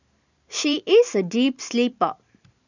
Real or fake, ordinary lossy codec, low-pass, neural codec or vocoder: fake; none; 7.2 kHz; vocoder, 44.1 kHz, 128 mel bands every 512 samples, BigVGAN v2